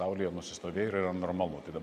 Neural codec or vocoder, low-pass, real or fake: none; 14.4 kHz; real